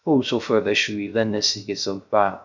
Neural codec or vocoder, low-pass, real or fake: codec, 16 kHz, 0.3 kbps, FocalCodec; 7.2 kHz; fake